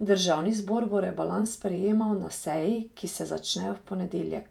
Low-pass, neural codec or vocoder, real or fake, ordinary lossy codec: 19.8 kHz; vocoder, 48 kHz, 128 mel bands, Vocos; fake; none